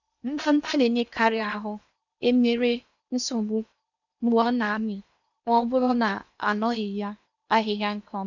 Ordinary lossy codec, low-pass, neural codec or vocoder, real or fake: none; 7.2 kHz; codec, 16 kHz in and 24 kHz out, 0.8 kbps, FocalCodec, streaming, 65536 codes; fake